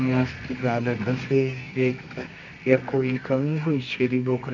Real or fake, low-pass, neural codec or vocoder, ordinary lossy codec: fake; 7.2 kHz; codec, 24 kHz, 0.9 kbps, WavTokenizer, medium music audio release; AAC, 48 kbps